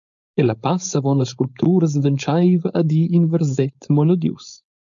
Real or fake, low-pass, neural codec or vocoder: fake; 7.2 kHz; codec, 16 kHz, 4.8 kbps, FACodec